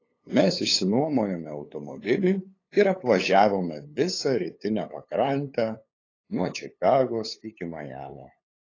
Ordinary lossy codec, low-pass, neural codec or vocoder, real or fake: AAC, 32 kbps; 7.2 kHz; codec, 16 kHz, 8 kbps, FunCodec, trained on LibriTTS, 25 frames a second; fake